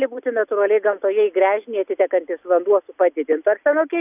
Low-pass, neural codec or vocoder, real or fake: 3.6 kHz; none; real